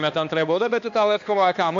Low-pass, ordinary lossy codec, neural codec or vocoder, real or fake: 7.2 kHz; MP3, 48 kbps; codec, 16 kHz, 2 kbps, X-Codec, HuBERT features, trained on balanced general audio; fake